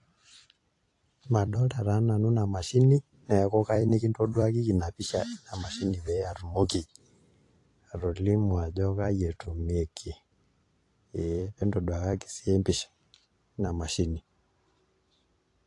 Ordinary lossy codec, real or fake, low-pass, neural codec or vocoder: AAC, 48 kbps; fake; 10.8 kHz; vocoder, 44.1 kHz, 128 mel bands every 256 samples, BigVGAN v2